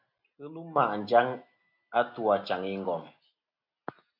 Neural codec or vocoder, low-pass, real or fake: none; 5.4 kHz; real